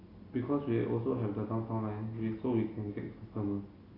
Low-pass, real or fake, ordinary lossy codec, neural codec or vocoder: 5.4 kHz; real; AAC, 24 kbps; none